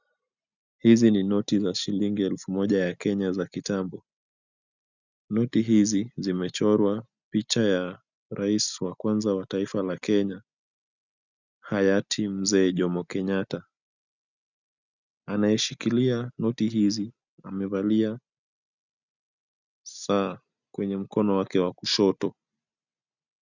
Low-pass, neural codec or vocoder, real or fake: 7.2 kHz; none; real